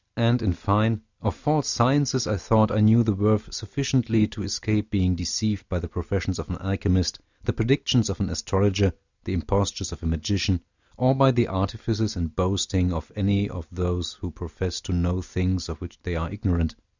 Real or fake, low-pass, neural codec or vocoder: real; 7.2 kHz; none